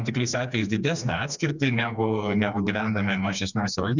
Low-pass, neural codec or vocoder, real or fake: 7.2 kHz; codec, 16 kHz, 2 kbps, FreqCodec, smaller model; fake